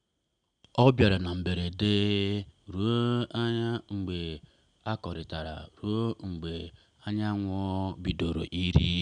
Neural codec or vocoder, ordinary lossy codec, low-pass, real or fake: none; none; 9.9 kHz; real